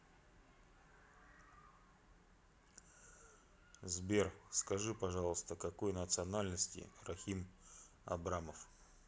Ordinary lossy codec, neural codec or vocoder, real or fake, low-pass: none; none; real; none